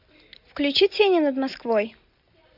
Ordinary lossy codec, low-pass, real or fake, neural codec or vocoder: MP3, 48 kbps; 5.4 kHz; real; none